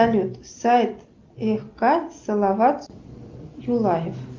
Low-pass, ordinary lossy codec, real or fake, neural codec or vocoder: 7.2 kHz; Opus, 24 kbps; real; none